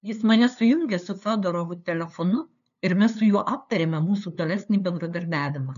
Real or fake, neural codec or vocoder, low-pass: fake; codec, 16 kHz, 2 kbps, FunCodec, trained on LibriTTS, 25 frames a second; 7.2 kHz